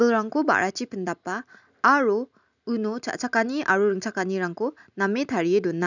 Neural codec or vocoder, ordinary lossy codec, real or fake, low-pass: none; none; real; 7.2 kHz